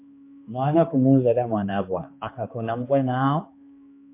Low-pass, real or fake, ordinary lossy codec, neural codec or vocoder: 3.6 kHz; fake; MP3, 24 kbps; codec, 16 kHz, 2 kbps, X-Codec, HuBERT features, trained on balanced general audio